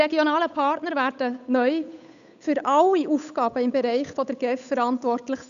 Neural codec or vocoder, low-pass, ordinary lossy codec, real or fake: codec, 16 kHz, 8 kbps, FunCodec, trained on Chinese and English, 25 frames a second; 7.2 kHz; none; fake